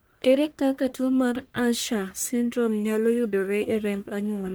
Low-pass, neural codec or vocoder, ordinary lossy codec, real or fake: none; codec, 44.1 kHz, 1.7 kbps, Pupu-Codec; none; fake